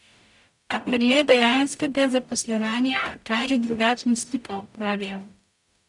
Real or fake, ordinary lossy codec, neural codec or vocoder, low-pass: fake; none; codec, 44.1 kHz, 0.9 kbps, DAC; 10.8 kHz